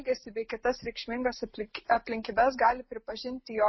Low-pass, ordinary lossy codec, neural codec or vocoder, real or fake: 7.2 kHz; MP3, 24 kbps; none; real